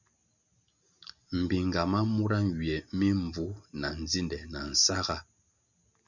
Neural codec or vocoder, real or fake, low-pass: none; real; 7.2 kHz